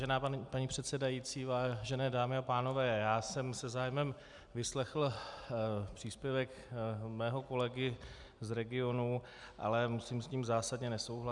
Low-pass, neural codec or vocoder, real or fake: 10.8 kHz; none; real